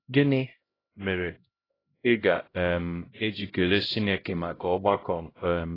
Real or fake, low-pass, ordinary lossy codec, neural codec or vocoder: fake; 5.4 kHz; AAC, 24 kbps; codec, 16 kHz, 0.5 kbps, X-Codec, HuBERT features, trained on LibriSpeech